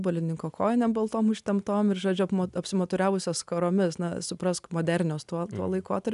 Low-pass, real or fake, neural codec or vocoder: 10.8 kHz; real; none